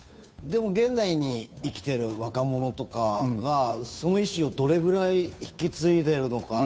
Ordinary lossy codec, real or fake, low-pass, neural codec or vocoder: none; fake; none; codec, 16 kHz, 2 kbps, FunCodec, trained on Chinese and English, 25 frames a second